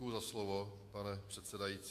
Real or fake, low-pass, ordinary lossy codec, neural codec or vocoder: fake; 14.4 kHz; MP3, 64 kbps; autoencoder, 48 kHz, 128 numbers a frame, DAC-VAE, trained on Japanese speech